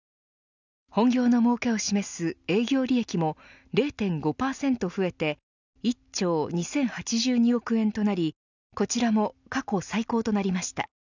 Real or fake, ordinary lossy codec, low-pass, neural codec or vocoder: real; none; 7.2 kHz; none